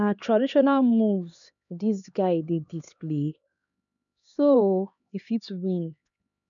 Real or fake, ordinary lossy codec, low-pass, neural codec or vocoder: fake; none; 7.2 kHz; codec, 16 kHz, 2 kbps, X-Codec, HuBERT features, trained on LibriSpeech